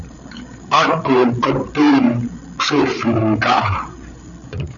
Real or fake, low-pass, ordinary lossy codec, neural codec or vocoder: fake; 7.2 kHz; MP3, 96 kbps; codec, 16 kHz, 8 kbps, FreqCodec, larger model